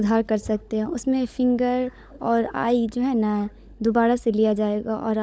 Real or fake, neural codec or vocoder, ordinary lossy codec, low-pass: fake; codec, 16 kHz, 16 kbps, FunCodec, trained on LibriTTS, 50 frames a second; none; none